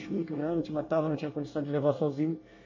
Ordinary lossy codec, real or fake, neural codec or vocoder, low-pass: MP3, 32 kbps; fake; codec, 24 kHz, 1 kbps, SNAC; 7.2 kHz